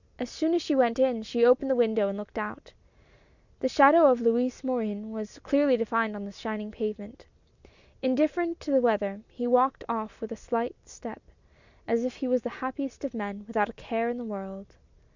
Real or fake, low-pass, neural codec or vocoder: real; 7.2 kHz; none